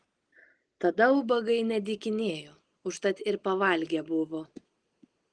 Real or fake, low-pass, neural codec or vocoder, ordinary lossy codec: real; 9.9 kHz; none; Opus, 24 kbps